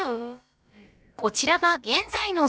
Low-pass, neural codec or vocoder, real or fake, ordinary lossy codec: none; codec, 16 kHz, about 1 kbps, DyCAST, with the encoder's durations; fake; none